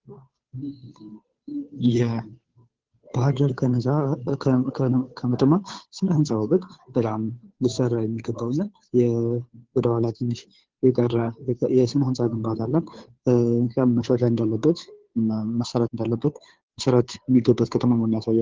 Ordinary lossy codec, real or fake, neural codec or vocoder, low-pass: Opus, 16 kbps; fake; codec, 16 kHz, 2 kbps, FunCodec, trained on Chinese and English, 25 frames a second; 7.2 kHz